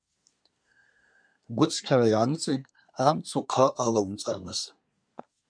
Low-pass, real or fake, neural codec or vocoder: 9.9 kHz; fake; codec, 24 kHz, 1 kbps, SNAC